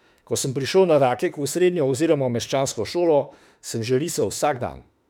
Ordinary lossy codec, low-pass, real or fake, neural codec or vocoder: none; 19.8 kHz; fake; autoencoder, 48 kHz, 32 numbers a frame, DAC-VAE, trained on Japanese speech